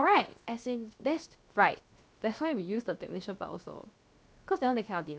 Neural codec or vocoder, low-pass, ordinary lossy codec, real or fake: codec, 16 kHz, 0.7 kbps, FocalCodec; none; none; fake